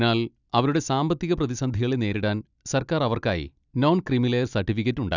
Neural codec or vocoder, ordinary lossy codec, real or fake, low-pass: none; none; real; 7.2 kHz